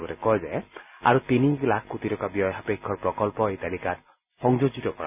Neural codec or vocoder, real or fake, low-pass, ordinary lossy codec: none; real; 3.6 kHz; none